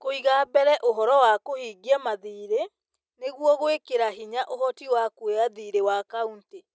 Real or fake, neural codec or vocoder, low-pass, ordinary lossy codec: real; none; none; none